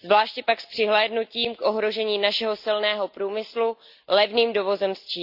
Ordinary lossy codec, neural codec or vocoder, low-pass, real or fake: Opus, 64 kbps; none; 5.4 kHz; real